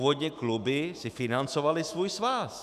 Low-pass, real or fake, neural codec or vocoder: 14.4 kHz; fake; autoencoder, 48 kHz, 128 numbers a frame, DAC-VAE, trained on Japanese speech